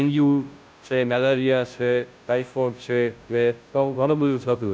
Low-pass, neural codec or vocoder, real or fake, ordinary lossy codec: none; codec, 16 kHz, 0.5 kbps, FunCodec, trained on Chinese and English, 25 frames a second; fake; none